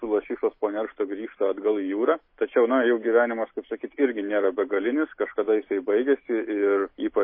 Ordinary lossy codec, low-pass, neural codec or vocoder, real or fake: MP3, 24 kbps; 5.4 kHz; none; real